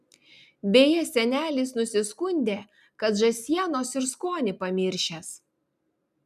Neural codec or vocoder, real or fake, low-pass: none; real; 14.4 kHz